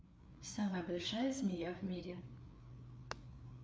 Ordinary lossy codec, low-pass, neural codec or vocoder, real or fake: none; none; codec, 16 kHz, 4 kbps, FreqCodec, larger model; fake